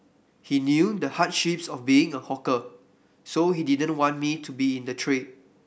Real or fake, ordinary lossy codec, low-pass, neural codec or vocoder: real; none; none; none